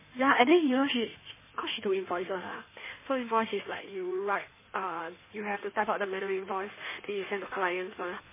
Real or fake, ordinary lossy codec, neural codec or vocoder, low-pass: fake; MP3, 16 kbps; codec, 16 kHz in and 24 kHz out, 1.1 kbps, FireRedTTS-2 codec; 3.6 kHz